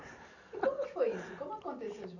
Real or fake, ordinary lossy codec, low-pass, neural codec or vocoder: real; none; 7.2 kHz; none